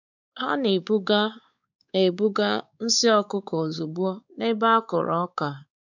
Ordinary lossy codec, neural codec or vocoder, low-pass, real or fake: none; codec, 16 kHz, 4 kbps, X-Codec, WavLM features, trained on Multilingual LibriSpeech; 7.2 kHz; fake